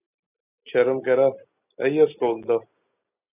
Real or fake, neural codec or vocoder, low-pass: real; none; 3.6 kHz